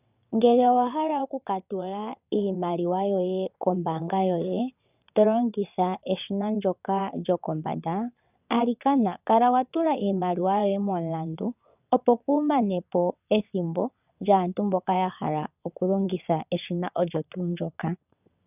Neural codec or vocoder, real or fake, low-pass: vocoder, 22.05 kHz, 80 mel bands, WaveNeXt; fake; 3.6 kHz